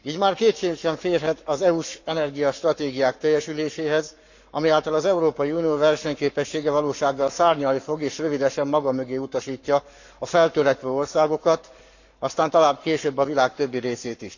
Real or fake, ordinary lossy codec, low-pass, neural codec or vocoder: fake; none; 7.2 kHz; codec, 44.1 kHz, 7.8 kbps, Pupu-Codec